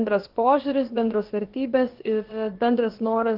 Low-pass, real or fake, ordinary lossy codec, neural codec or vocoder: 5.4 kHz; fake; Opus, 16 kbps; codec, 16 kHz, about 1 kbps, DyCAST, with the encoder's durations